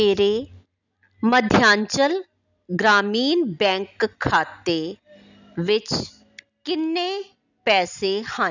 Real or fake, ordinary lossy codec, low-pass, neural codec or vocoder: real; none; 7.2 kHz; none